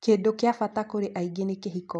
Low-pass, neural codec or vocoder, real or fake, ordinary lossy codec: 10.8 kHz; none; real; none